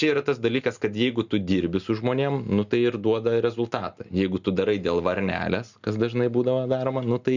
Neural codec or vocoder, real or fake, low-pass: none; real; 7.2 kHz